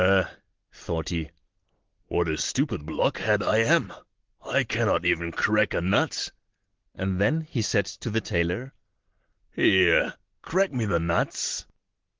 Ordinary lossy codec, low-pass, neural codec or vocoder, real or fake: Opus, 16 kbps; 7.2 kHz; none; real